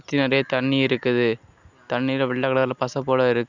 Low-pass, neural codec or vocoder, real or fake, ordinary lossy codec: 7.2 kHz; none; real; Opus, 64 kbps